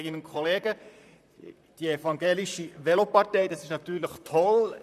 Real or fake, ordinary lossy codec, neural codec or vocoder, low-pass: fake; none; vocoder, 44.1 kHz, 128 mel bands, Pupu-Vocoder; 14.4 kHz